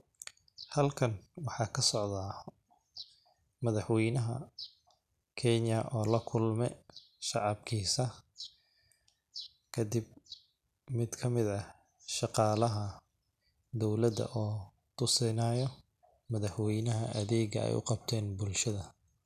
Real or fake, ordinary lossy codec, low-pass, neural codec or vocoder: real; none; 14.4 kHz; none